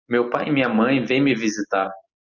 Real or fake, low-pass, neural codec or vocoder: real; 7.2 kHz; none